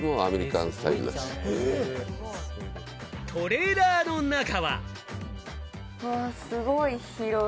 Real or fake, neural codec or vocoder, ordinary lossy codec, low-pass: real; none; none; none